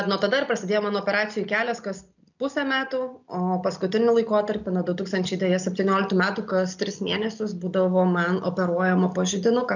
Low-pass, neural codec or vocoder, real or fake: 7.2 kHz; none; real